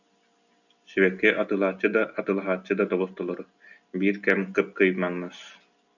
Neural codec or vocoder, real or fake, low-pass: none; real; 7.2 kHz